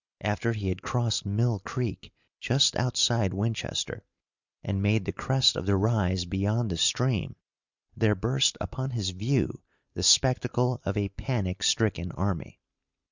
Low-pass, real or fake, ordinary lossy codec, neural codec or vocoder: 7.2 kHz; real; Opus, 64 kbps; none